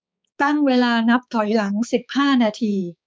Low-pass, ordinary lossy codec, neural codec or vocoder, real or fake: none; none; codec, 16 kHz, 4 kbps, X-Codec, HuBERT features, trained on balanced general audio; fake